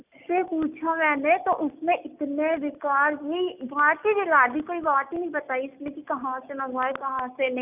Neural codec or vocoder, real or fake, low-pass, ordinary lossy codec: none; real; 3.6 kHz; none